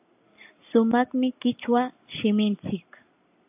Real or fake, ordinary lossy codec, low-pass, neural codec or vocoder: real; AAC, 32 kbps; 3.6 kHz; none